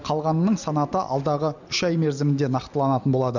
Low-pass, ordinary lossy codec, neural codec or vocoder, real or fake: 7.2 kHz; none; none; real